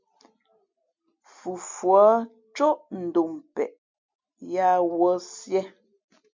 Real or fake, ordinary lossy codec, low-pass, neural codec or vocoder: real; MP3, 64 kbps; 7.2 kHz; none